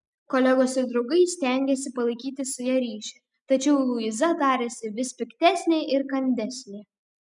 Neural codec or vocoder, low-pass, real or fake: none; 10.8 kHz; real